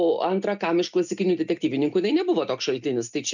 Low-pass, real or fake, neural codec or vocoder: 7.2 kHz; real; none